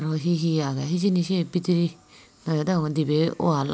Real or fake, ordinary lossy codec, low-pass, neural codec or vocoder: real; none; none; none